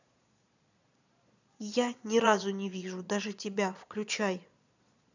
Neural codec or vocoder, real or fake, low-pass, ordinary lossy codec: vocoder, 22.05 kHz, 80 mel bands, Vocos; fake; 7.2 kHz; none